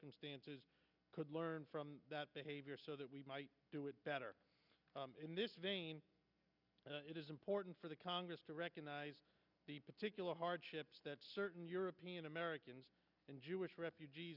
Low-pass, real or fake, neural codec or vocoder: 5.4 kHz; real; none